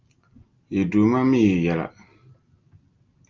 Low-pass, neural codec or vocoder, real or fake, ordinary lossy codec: 7.2 kHz; none; real; Opus, 24 kbps